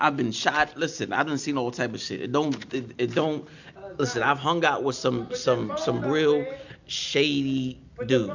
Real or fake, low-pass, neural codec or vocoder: real; 7.2 kHz; none